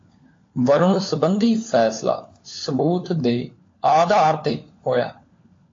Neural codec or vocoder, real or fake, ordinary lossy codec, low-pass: codec, 16 kHz, 4 kbps, FunCodec, trained on LibriTTS, 50 frames a second; fake; AAC, 32 kbps; 7.2 kHz